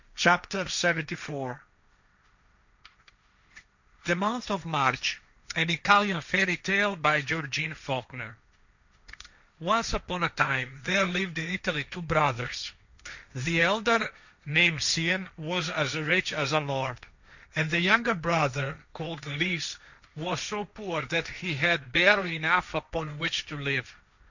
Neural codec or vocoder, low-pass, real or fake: codec, 16 kHz, 1.1 kbps, Voila-Tokenizer; 7.2 kHz; fake